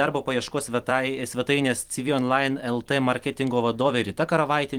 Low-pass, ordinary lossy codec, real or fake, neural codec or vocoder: 19.8 kHz; Opus, 24 kbps; fake; vocoder, 44.1 kHz, 128 mel bands every 256 samples, BigVGAN v2